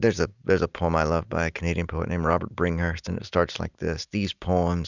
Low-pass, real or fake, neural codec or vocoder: 7.2 kHz; real; none